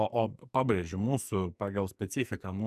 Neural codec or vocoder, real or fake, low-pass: codec, 44.1 kHz, 2.6 kbps, SNAC; fake; 14.4 kHz